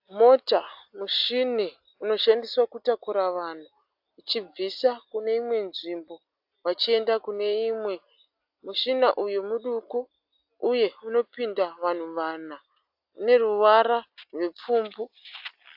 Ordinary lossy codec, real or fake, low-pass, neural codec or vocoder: AAC, 48 kbps; real; 5.4 kHz; none